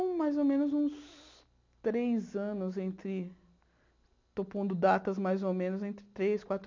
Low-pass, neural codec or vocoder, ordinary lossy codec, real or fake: 7.2 kHz; none; MP3, 64 kbps; real